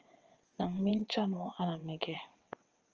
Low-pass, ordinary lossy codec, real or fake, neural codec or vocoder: 7.2 kHz; Opus, 24 kbps; fake; vocoder, 22.05 kHz, 80 mel bands, WaveNeXt